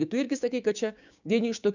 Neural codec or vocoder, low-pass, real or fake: codec, 16 kHz, 6 kbps, DAC; 7.2 kHz; fake